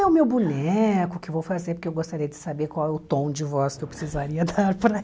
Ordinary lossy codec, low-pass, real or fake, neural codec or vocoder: none; none; real; none